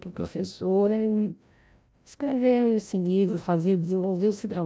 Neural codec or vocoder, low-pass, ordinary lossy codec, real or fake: codec, 16 kHz, 0.5 kbps, FreqCodec, larger model; none; none; fake